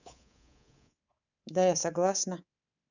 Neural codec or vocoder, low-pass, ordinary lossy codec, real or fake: codec, 24 kHz, 3.1 kbps, DualCodec; 7.2 kHz; none; fake